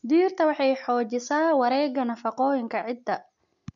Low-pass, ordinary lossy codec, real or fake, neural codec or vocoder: 7.2 kHz; none; real; none